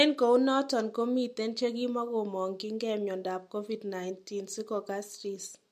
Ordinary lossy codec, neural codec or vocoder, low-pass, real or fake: MP3, 64 kbps; none; 14.4 kHz; real